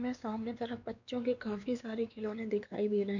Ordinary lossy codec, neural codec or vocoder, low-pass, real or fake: none; codec, 16 kHz, 2 kbps, X-Codec, WavLM features, trained on Multilingual LibriSpeech; 7.2 kHz; fake